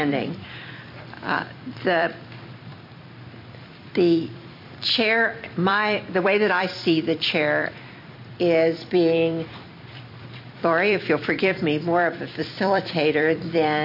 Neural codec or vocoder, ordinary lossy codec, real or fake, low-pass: vocoder, 44.1 kHz, 128 mel bands every 256 samples, BigVGAN v2; MP3, 32 kbps; fake; 5.4 kHz